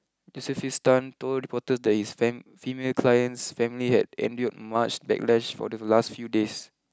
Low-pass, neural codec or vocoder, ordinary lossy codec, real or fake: none; none; none; real